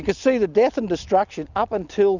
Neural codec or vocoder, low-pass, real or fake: none; 7.2 kHz; real